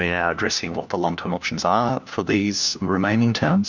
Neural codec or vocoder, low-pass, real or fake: codec, 16 kHz, 1 kbps, FunCodec, trained on LibriTTS, 50 frames a second; 7.2 kHz; fake